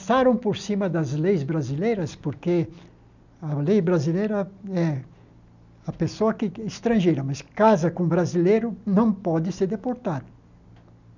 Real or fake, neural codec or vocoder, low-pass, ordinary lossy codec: real; none; 7.2 kHz; none